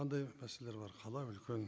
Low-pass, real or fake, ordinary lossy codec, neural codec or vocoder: none; real; none; none